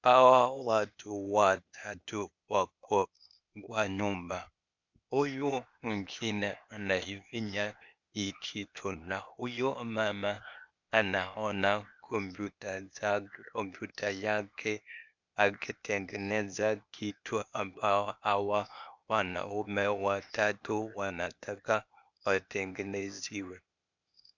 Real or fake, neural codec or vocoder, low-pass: fake; codec, 16 kHz, 0.8 kbps, ZipCodec; 7.2 kHz